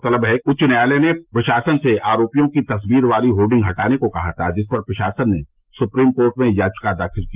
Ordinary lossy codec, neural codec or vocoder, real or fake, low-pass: Opus, 32 kbps; none; real; 3.6 kHz